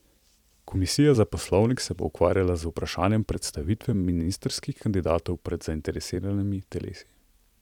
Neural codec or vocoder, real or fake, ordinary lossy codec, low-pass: vocoder, 44.1 kHz, 128 mel bands every 256 samples, BigVGAN v2; fake; none; 19.8 kHz